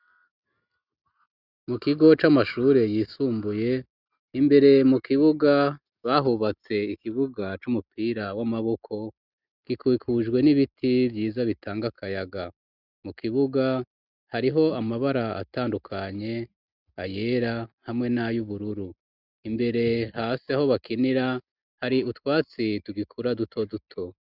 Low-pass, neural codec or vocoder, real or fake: 5.4 kHz; none; real